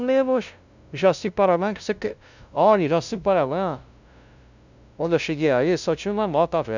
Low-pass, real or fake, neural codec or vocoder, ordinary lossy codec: 7.2 kHz; fake; codec, 16 kHz, 0.5 kbps, FunCodec, trained on LibriTTS, 25 frames a second; none